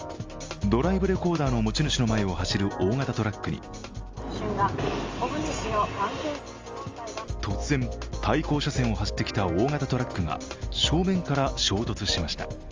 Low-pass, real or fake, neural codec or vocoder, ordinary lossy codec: 7.2 kHz; real; none; Opus, 32 kbps